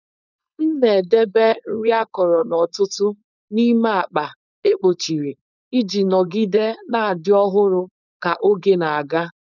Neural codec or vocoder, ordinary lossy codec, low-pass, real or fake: codec, 16 kHz, 4.8 kbps, FACodec; none; 7.2 kHz; fake